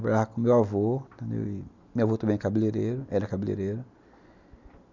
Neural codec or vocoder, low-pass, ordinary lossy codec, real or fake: none; 7.2 kHz; none; real